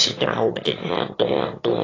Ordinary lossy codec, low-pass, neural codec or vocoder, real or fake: AAC, 32 kbps; 7.2 kHz; autoencoder, 22.05 kHz, a latent of 192 numbers a frame, VITS, trained on one speaker; fake